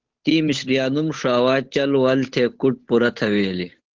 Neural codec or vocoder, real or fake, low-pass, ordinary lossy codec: codec, 16 kHz, 8 kbps, FunCodec, trained on Chinese and English, 25 frames a second; fake; 7.2 kHz; Opus, 16 kbps